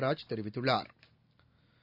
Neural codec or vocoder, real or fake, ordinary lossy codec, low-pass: none; real; none; 5.4 kHz